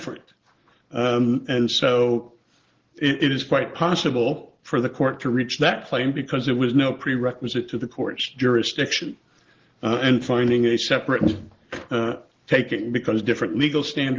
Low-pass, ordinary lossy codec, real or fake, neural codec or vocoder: 7.2 kHz; Opus, 16 kbps; real; none